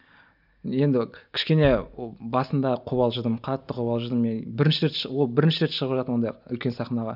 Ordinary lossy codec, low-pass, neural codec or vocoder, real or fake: none; 5.4 kHz; none; real